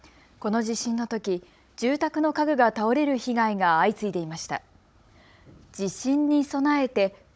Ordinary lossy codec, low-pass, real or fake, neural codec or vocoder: none; none; fake; codec, 16 kHz, 16 kbps, FunCodec, trained on Chinese and English, 50 frames a second